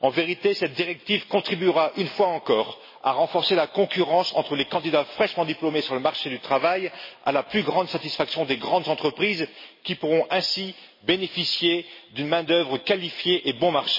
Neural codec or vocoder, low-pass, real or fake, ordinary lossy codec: none; 5.4 kHz; real; MP3, 24 kbps